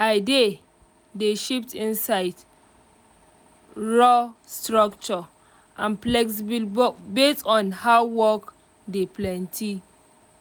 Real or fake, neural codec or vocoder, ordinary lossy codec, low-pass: real; none; none; none